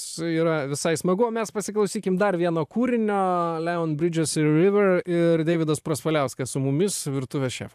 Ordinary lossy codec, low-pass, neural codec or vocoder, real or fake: AAC, 96 kbps; 14.4 kHz; vocoder, 44.1 kHz, 128 mel bands every 512 samples, BigVGAN v2; fake